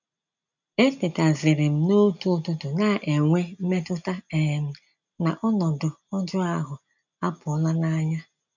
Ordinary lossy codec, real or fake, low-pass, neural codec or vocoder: none; real; 7.2 kHz; none